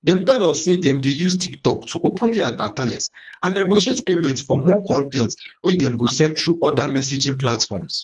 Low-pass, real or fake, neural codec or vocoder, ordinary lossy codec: 10.8 kHz; fake; codec, 24 kHz, 1.5 kbps, HILCodec; none